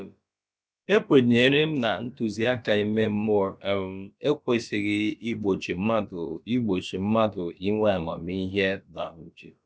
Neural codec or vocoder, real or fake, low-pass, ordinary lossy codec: codec, 16 kHz, about 1 kbps, DyCAST, with the encoder's durations; fake; none; none